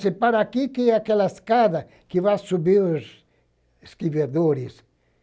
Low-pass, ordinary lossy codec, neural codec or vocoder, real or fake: none; none; none; real